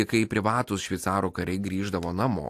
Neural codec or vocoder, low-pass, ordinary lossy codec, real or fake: none; 14.4 kHz; AAC, 64 kbps; real